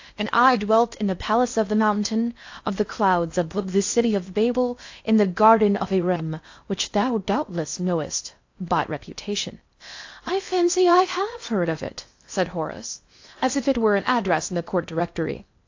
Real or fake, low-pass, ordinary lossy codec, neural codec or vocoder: fake; 7.2 kHz; AAC, 48 kbps; codec, 16 kHz in and 24 kHz out, 0.6 kbps, FocalCodec, streaming, 2048 codes